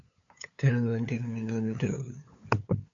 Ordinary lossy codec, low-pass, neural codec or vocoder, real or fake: AAC, 48 kbps; 7.2 kHz; codec, 16 kHz, 8 kbps, FunCodec, trained on LibriTTS, 25 frames a second; fake